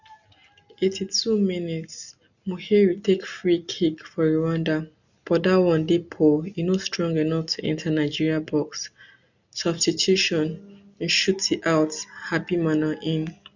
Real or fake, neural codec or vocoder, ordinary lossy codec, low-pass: real; none; none; 7.2 kHz